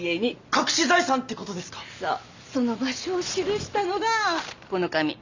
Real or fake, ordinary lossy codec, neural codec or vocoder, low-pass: real; Opus, 64 kbps; none; 7.2 kHz